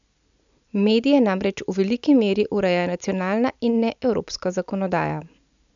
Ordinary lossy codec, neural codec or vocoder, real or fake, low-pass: MP3, 96 kbps; none; real; 7.2 kHz